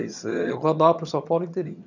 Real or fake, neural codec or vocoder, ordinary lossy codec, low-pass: fake; vocoder, 22.05 kHz, 80 mel bands, HiFi-GAN; none; 7.2 kHz